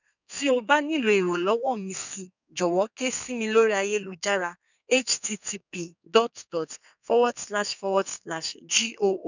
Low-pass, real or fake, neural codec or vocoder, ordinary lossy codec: 7.2 kHz; fake; codec, 32 kHz, 1.9 kbps, SNAC; none